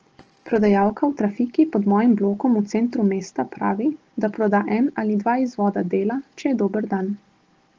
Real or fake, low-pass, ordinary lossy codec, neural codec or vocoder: real; 7.2 kHz; Opus, 16 kbps; none